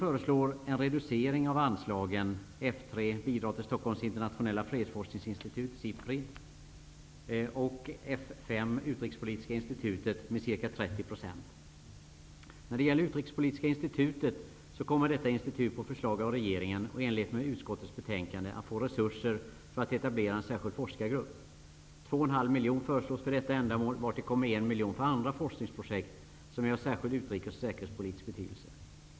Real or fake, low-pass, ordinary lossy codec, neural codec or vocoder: real; none; none; none